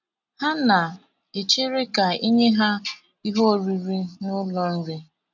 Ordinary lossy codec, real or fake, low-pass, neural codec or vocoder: none; real; 7.2 kHz; none